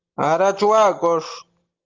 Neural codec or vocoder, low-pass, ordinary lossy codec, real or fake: none; 7.2 kHz; Opus, 24 kbps; real